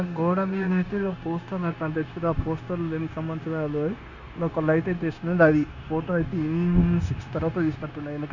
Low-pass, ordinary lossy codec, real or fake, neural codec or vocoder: 7.2 kHz; none; fake; codec, 16 kHz, 0.9 kbps, LongCat-Audio-Codec